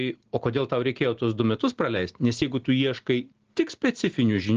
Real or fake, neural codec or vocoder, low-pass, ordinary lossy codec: real; none; 7.2 kHz; Opus, 16 kbps